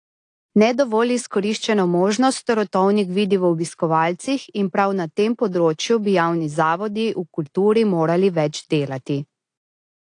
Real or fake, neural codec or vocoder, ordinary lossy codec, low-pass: real; none; AAC, 48 kbps; 9.9 kHz